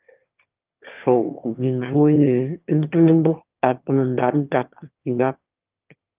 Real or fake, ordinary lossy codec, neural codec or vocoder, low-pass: fake; Opus, 24 kbps; autoencoder, 22.05 kHz, a latent of 192 numbers a frame, VITS, trained on one speaker; 3.6 kHz